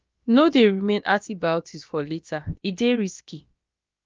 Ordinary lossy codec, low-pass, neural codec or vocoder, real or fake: Opus, 24 kbps; 7.2 kHz; codec, 16 kHz, about 1 kbps, DyCAST, with the encoder's durations; fake